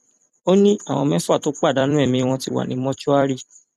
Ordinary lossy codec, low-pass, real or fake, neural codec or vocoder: none; 14.4 kHz; fake; vocoder, 44.1 kHz, 128 mel bands every 256 samples, BigVGAN v2